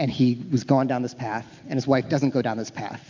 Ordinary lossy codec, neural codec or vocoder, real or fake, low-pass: MP3, 64 kbps; none; real; 7.2 kHz